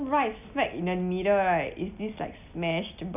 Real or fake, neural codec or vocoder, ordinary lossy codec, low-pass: real; none; none; 3.6 kHz